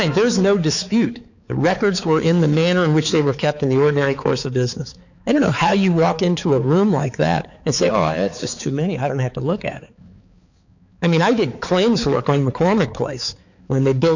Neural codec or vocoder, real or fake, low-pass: codec, 16 kHz, 4 kbps, X-Codec, HuBERT features, trained on balanced general audio; fake; 7.2 kHz